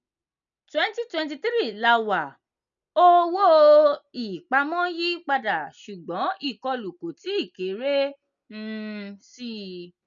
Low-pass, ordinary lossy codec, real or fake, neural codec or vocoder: 7.2 kHz; none; real; none